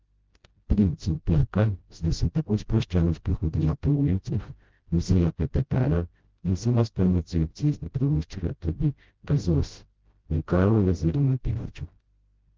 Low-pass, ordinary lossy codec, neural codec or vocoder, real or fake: 7.2 kHz; Opus, 16 kbps; codec, 16 kHz, 0.5 kbps, FreqCodec, smaller model; fake